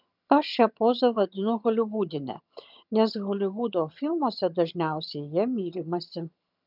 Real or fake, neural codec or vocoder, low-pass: fake; vocoder, 22.05 kHz, 80 mel bands, HiFi-GAN; 5.4 kHz